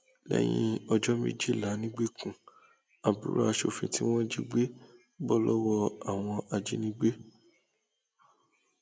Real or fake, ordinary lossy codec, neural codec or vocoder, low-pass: real; none; none; none